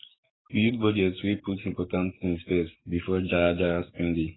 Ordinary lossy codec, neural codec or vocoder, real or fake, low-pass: AAC, 16 kbps; codec, 16 kHz, 6 kbps, DAC; fake; 7.2 kHz